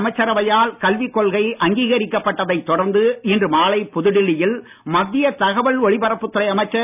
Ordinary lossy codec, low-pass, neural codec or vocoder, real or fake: none; 3.6 kHz; none; real